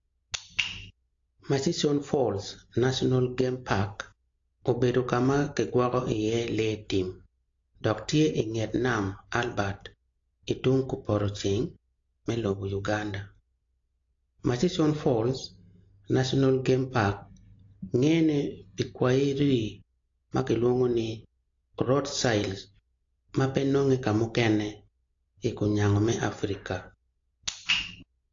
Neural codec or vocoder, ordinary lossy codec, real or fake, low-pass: none; AAC, 48 kbps; real; 7.2 kHz